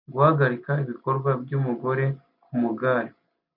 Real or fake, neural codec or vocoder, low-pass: real; none; 5.4 kHz